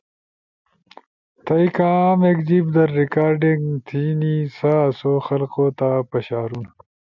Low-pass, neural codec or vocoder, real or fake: 7.2 kHz; none; real